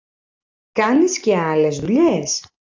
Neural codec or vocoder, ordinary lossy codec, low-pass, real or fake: none; AAC, 48 kbps; 7.2 kHz; real